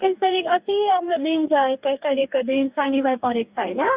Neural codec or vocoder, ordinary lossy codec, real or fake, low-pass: codec, 44.1 kHz, 2.6 kbps, DAC; Opus, 64 kbps; fake; 3.6 kHz